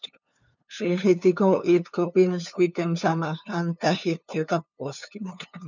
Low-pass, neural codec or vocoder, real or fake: 7.2 kHz; codec, 16 kHz, 2 kbps, FunCodec, trained on LibriTTS, 25 frames a second; fake